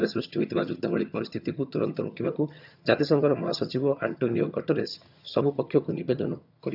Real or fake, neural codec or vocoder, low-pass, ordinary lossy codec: fake; vocoder, 22.05 kHz, 80 mel bands, HiFi-GAN; 5.4 kHz; none